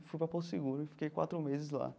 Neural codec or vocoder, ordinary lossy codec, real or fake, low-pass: none; none; real; none